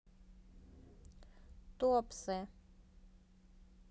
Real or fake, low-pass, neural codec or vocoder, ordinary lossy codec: real; none; none; none